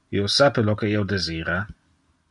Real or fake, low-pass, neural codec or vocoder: real; 10.8 kHz; none